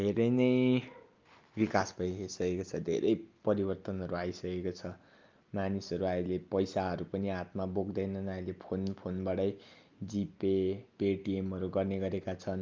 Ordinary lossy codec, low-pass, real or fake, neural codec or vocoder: Opus, 24 kbps; 7.2 kHz; real; none